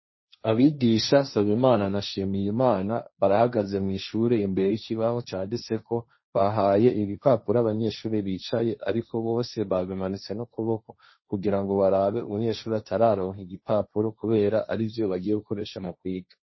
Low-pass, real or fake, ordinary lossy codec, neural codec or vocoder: 7.2 kHz; fake; MP3, 24 kbps; codec, 16 kHz, 1.1 kbps, Voila-Tokenizer